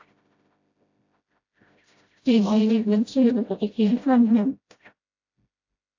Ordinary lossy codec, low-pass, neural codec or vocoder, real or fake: AAC, 48 kbps; 7.2 kHz; codec, 16 kHz, 0.5 kbps, FreqCodec, smaller model; fake